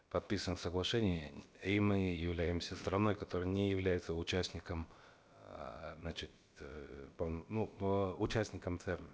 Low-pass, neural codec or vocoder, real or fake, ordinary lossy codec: none; codec, 16 kHz, about 1 kbps, DyCAST, with the encoder's durations; fake; none